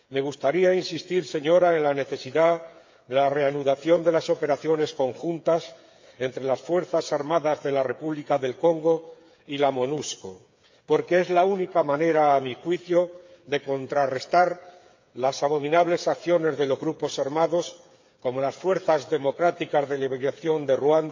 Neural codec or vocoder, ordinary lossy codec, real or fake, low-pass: codec, 16 kHz, 8 kbps, FreqCodec, smaller model; MP3, 48 kbps; fake; 7.2 kHz